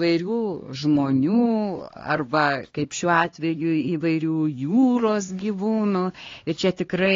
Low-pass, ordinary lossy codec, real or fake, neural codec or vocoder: 7.2 kHz; AAC, 32 kbps; fake; codec, 16 kHz, 2 kbps, X-Codec, HuBERT features, trained on LibriSpeech